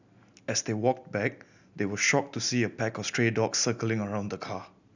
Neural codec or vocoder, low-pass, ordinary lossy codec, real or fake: none; 7.2 kHz; none; real